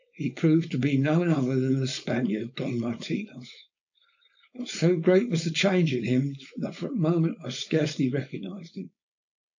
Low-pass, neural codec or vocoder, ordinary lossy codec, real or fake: 7.2 kHz; codec, 16 kHz, 4.8 kbps, FACodec; AAC, 48 kbps; fake